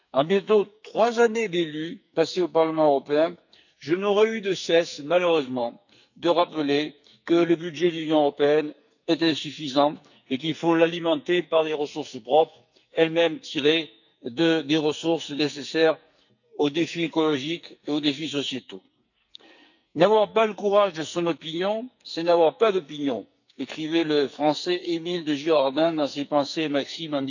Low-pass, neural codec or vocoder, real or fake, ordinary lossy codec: 7.2 kHz; codec, 44.1 kHz, 2.6 kbps, SNAC; fake; none